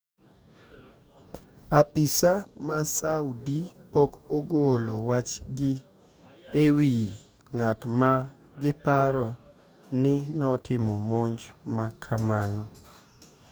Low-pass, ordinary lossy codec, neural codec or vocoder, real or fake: none; none; codec, 44.1 kHz, 2.6 kbps, DAC; fake